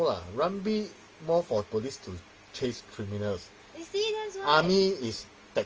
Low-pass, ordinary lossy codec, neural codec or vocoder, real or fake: 7.2 kHz; Opus, 24 kbps; none; real